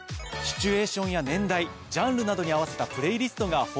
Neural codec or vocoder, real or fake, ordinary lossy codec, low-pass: none; real; none; none